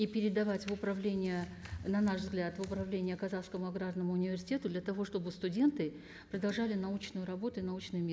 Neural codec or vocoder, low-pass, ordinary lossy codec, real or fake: none; none; none; real